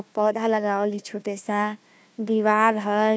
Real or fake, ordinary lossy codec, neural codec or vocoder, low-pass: fake; none; codec, 16 kHz, 1 kbps, FunCodec, trained on Chinese and English, 50 frames a second; none